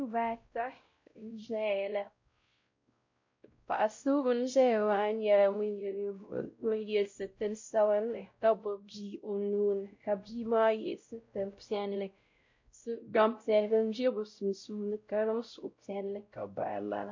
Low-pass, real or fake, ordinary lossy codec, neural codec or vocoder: 7.2 kHz; fake; MP3, 48 kbps; codec, 16 kHz, 0.5 kbps, X-Codec, WavLM features, trained on Multilingual LibriSpeech